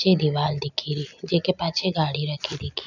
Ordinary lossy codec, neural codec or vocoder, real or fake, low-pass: none; none; real; 7.2 kHz